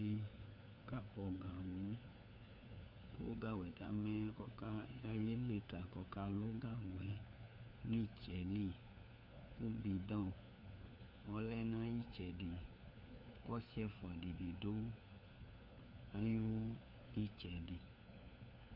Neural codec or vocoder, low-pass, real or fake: codec, 16 kHz, 4 kbps, FunCodec, trained on LibriTTS, 50 frames a second; 5.4 kHz; fake